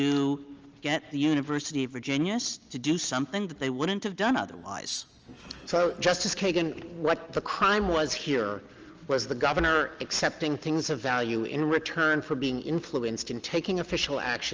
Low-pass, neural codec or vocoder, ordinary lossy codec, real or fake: 7.2 kHz; none; Opus, 24 kbps; real